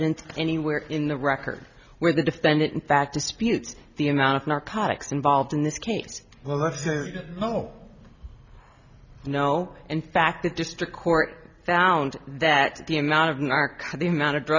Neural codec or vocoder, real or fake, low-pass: none; real; 7.2 kHz